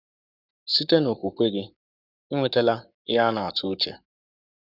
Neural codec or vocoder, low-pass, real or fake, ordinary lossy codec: codec, 44.1 kHz, 7.8 kbps, Pupu-Codec; 5.4 kHz; fake; none